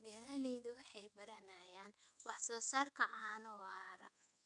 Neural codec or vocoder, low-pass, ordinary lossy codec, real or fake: codec, 24 kHz, 1.2 kbps, DualCodec; 10.8 kHz; none; fake